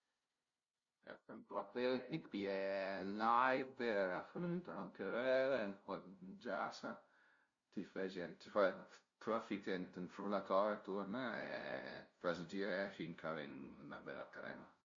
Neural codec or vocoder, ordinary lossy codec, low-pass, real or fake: codec, 16 kHz, 0.5 kbps, FunCodec, trained on LibriTTS, 25 frames a second; MP3, 48 kbps; 7.2 kHz; fake